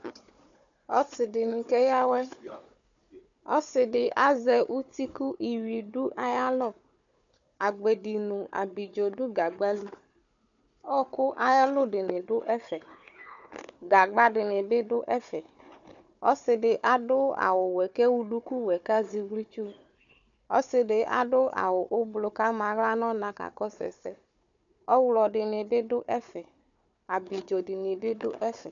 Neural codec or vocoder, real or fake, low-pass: codec, 16 kHz, 4 kbps, FunCodec, trained on Chinese and English, 50 frames a second; fake; 7.2 kHz